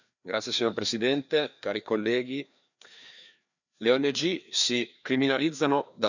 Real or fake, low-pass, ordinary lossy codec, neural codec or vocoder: fake; 7.2 kHz; none; codec, 16 kHz, 2 kbps, FreqCodec, larger model